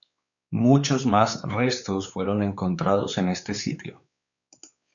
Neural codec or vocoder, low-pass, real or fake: codec, 16 kHz, 4 kbps, X-Codec, WavLM features, trained on Multilingual LibriSpeech; 7.2 kHz; fake